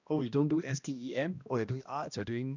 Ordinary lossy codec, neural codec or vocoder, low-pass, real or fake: none; codec, 16 kHz, 1 kbps, X-Codec, HuBERT features, trained on balanced general audio; 7.2 kHz; fake